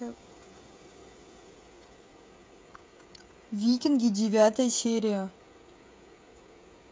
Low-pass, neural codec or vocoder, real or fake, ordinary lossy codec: none; codec, 16 kHz, 16 kbps, FreqCodec, smaller model; fake; none